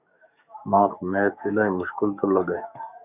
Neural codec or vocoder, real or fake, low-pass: codec, 44.1 kHz, 7.8 kbps, DAC; fake; 3.6 kHz